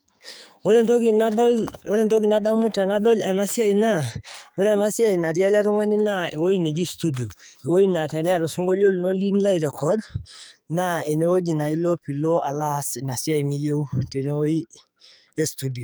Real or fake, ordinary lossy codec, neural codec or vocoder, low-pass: fake; none; codec, 44.1 kHz, 2.6 kbps, SNAC; none